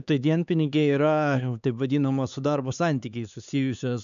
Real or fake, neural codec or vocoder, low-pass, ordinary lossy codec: fake; codec, 16 kHz, 4 kbps, X-Codec, HuBERT features, trained on LibriSpeech; 7.2 kHz; AAC, 96 kbps